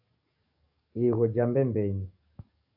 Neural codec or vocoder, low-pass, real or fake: codec, 44.1 kHz, 7.8 kbps, DAC; 5.4 kHz; fake